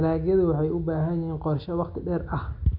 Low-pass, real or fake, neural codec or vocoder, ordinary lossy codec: 5.4 kHz; real; none; none